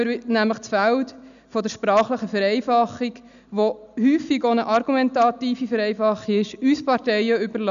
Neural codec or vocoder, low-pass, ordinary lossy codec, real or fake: none; 7.2 kHz; none; real